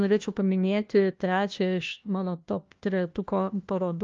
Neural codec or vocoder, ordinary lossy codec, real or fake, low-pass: codec, 16 kHz, 1 kbps, FunCodec, trained on LibriTTS, 50 frames a second; Opus, 32 kbps; fake; 7.2 kHz